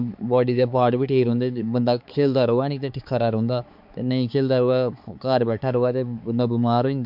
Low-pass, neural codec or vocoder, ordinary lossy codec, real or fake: 5.4 kHz; codec, 16 kHz, 4 kbps, X-Codec, HuBERT features, trained on balanced general audio; MP3, 48 kbps; fake